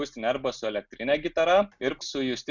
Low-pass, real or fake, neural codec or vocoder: 7.2 kHz; real; none